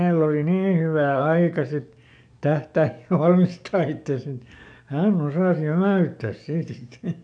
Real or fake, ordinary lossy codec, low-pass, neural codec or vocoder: fake; none; 9.9 kHz; codec, 44.1 kHz, 7.8 kbps, DAC